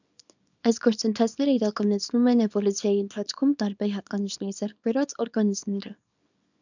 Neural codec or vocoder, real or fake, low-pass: codec, 24 kHz, 0.9 kbps, WavTokenizer, small release; fake; 7.2 kHz